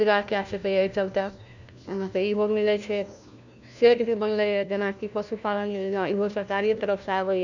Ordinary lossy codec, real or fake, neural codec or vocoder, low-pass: none; fake; codec, 16 kHz, 1 kbps, FunCodec, trained on LibriTTS, 50 frames a second; 7.2 kHz